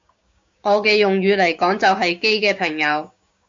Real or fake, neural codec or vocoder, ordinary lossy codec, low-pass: fake; codec, 16 kHz, 6 kbps, DAC; MP3, 48 kbps; 7.2 kHz